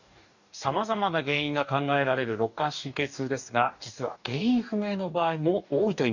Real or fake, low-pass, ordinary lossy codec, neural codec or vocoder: fake; 7.2 kHz; none; codec, 44.1 kHz, 2.6 kbps, DAC